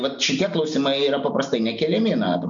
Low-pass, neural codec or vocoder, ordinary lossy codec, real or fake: 7.2 kHz; none; MP3, 48 kbps; real